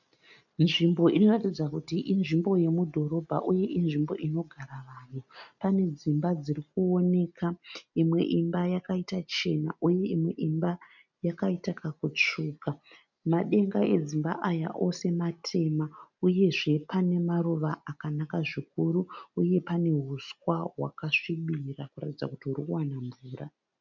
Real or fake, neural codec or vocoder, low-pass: real; none; 7.2 kHz